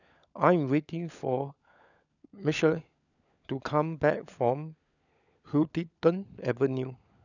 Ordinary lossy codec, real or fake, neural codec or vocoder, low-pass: none; fake; codec, 16 kHz, 16 kbps, FunCodec, trained on LibriTTS, 50 frames a second; 7.2 kHz